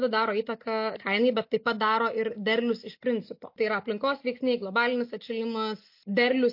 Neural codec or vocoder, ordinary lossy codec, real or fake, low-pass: none; MP3, 32 kbps; real; 5.4 kHz